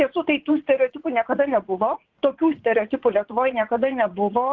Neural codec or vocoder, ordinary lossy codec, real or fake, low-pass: vocoder, 44.1 kHz, 80 mel bands, Vocos; Opus, 16 kbps; fake; 7.2 kHz